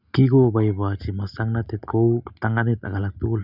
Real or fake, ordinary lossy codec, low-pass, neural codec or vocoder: real; none; 5.4 kHz; none